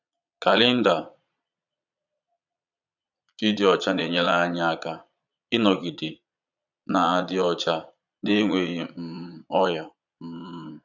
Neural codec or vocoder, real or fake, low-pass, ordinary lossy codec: vocoder, 22.05 kHz, 80 mel bands, Vocos; fake; 7.2 kHz; none